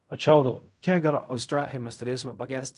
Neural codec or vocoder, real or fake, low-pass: codec, 16 kHz in and 24 kHz out, 0.4 kbps, LongCat-Audio-Codec, fine tuned four codebook decoder; fake; 10.8 kHz